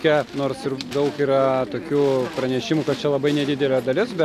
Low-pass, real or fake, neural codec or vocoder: 14.4 kHz; real; none